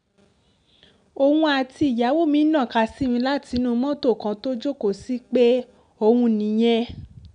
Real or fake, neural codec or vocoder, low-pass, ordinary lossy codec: real; none; 9.9 kHz; none